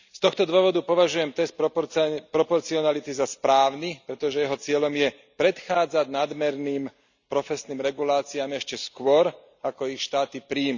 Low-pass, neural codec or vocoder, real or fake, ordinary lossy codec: 7.2 kHz; none; real; none